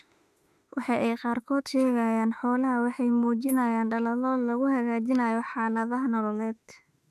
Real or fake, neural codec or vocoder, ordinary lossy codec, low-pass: fake; autoencoder, 48 kHz, 32 numbers a frame, DAC-VAE, trained on Japanese speech; none; 14.4 kHz